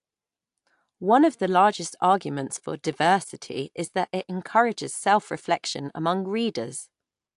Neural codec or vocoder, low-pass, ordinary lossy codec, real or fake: none; 10.8 kHz; AAC, 64 kbps; real